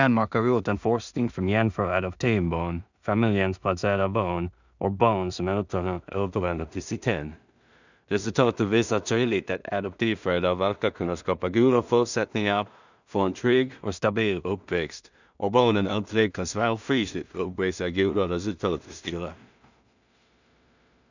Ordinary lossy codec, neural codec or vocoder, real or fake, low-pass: none; codec, 16 kHz in and 24 kHz out, 0.4 kbps, LongCat-Audio-Codec, two codebook decoder; fake; 7.2 kHz